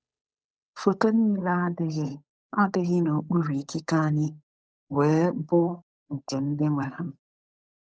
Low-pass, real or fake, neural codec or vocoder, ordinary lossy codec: none; fake; codec, 16 kHz, 8 kbps, FunCodec, trained on Chinese and English, 25 frames a second; none